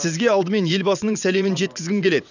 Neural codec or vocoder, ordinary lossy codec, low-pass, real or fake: vocoder, 22.05 kHz, 80 mel bands, WaveNeXt; none; 7.2 kHz; fake